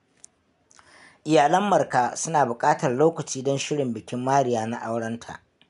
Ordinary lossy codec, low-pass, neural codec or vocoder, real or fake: none; 10.8 kHz; none; real